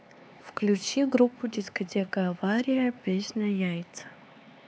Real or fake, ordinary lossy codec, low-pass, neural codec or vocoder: fake; none; none; codec, 16 kHz, 4 kbps, X-Codec, HuBERT features, trained on LibriSpeech